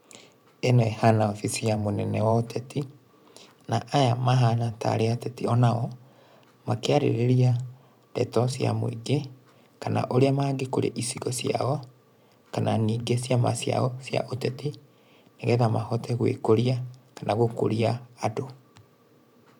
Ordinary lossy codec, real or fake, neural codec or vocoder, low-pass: none; fake; vocoder, 44.1 kHz, 128 mel bands every 512 samples, BigVGAN v2; 19.8 kHz